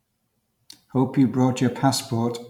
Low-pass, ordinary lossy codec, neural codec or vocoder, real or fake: 19.8 kHz; MP3, 96 kbps; vocoder, 44.1 kHz, 128 mel bands every 512 samples, BigVGAN v2; fake